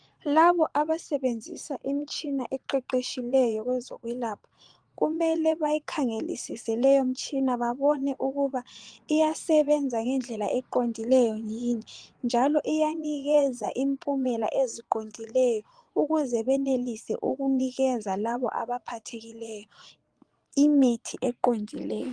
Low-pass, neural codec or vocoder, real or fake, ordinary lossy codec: 9.9 kHz; vocoder, 22.05 kHz, 80 mel bands, Vocos; fake; Opus, 24 kbps